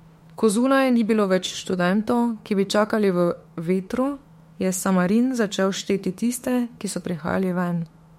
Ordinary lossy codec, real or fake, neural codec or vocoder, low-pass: MP3, 64 kbps; fake; autoencoder, 48 kHz, 32 numbers a frame, DAC-VAE, trained on Japanese speech; 19.8 kHz